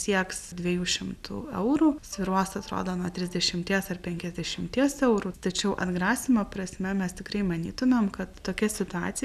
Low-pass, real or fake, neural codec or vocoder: 14.4 kHz; real; none